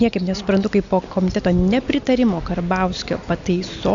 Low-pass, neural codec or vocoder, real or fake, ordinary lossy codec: 7.2 kHz; none; real; AAC, 64 kbps